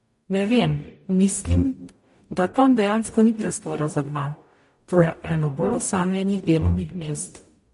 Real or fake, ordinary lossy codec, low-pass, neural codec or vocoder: fake; MP3, 48 kbps; 14.4 kHz; codec, 44.1 kHz, 0.9 kbps, DAC